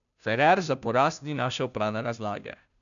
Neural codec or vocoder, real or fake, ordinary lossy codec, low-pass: codec, 16 kHz, 0.5 kbps, FunCodec, trained on Chinese and English, 25 frames a second; fake; none; 7.2 kHz